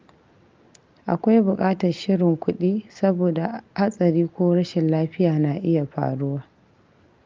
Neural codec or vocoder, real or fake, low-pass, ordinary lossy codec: none; real; 7.2 kHz; Opus, 24 kbps